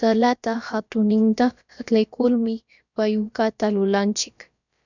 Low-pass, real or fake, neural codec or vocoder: 7.2 kHz; fake; codec, 16 kHz, about 1 kbps, DyCAST, with the encoder's durations